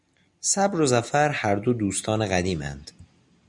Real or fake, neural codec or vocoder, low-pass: real; none; 10.8 kHz